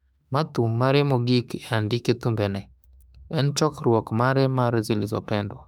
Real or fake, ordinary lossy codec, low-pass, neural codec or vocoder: fake; none; 19.8 kHz; autoencoder, 48 kHz, 32 numbers a frame, DAC-VAE, trained on Japanese speech